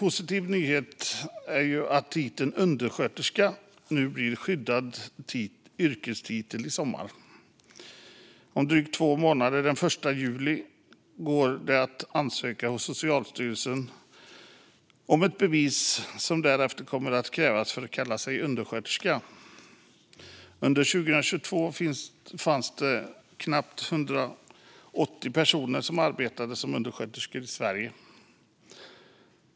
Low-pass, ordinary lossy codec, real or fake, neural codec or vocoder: none; none; real; none